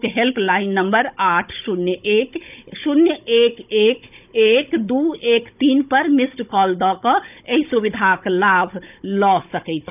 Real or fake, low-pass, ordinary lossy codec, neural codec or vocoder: fake; 3.6 kHz; none; codec, 16 kHz, 16 kbps, FunCodec, trained on Chinese and English, 50 frames a second